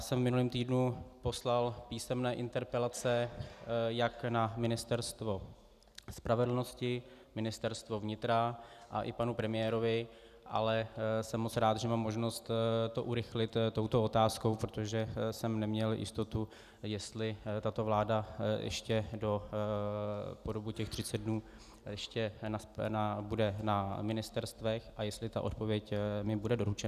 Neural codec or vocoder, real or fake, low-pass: none; real; 14.4 kHz